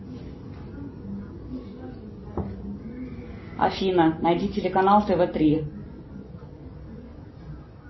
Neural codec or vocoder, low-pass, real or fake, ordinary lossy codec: none; 7.2 kHz; real; MP3, 24 kbps